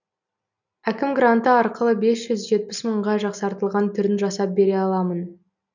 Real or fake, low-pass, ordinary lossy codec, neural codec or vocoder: real; 7.2 kHz; none; none